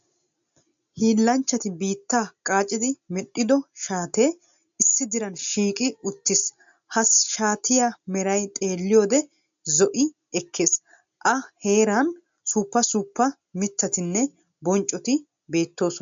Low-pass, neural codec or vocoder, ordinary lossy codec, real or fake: 7.2 kHz; none; MP3, 64 kbps; real